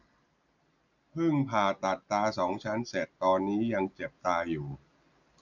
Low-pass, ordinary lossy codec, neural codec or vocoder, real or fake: 7.2 kHz; none; none; real